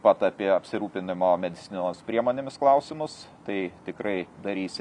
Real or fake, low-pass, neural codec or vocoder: real; 10.8 kHz; none